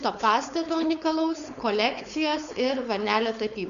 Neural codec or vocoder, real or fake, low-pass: codec, 16 kHz, 4.8 kbps, FACodec; fake; 7.2 kHz